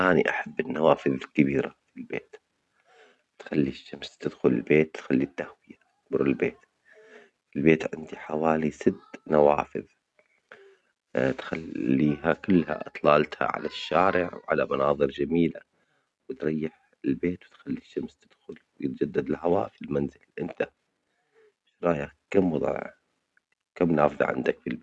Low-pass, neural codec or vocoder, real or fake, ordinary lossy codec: none; none; real; none